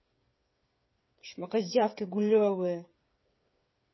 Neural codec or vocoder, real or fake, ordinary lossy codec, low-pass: codec, 16 kHz, 8 kbps, FreqCodec, smaller model; fake; MP3, 24 kbps; 7.2 kHz